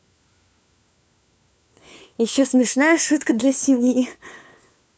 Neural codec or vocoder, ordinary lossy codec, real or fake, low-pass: codec, 16 kHz, 4 kbps, FunCodec, trained on LibriTTS, 50 frames a second; none; fake; none